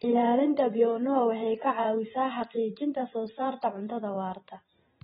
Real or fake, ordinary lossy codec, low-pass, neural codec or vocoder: fake; AAC, 16 kbps; 19.8 kHz; vocoder, 44.1 kHz, 128 mel bands every 512 samples, BigVGAN v2